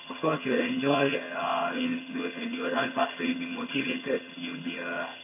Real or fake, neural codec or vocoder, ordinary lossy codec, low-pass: fake; vocoder, 22.05 kHz, 80 mel bands, HiFi-GAN; none; 3.6 kHz